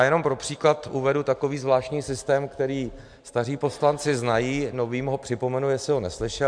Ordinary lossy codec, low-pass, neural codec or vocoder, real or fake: MP3, 64 kbps; 9.9 kHz; none; real